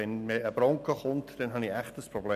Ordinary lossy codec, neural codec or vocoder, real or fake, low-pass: none; none; real; 14.4 kHz